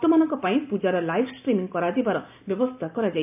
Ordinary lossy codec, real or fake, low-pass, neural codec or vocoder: AAC, 32 kbps; real; 3.6 kHz; none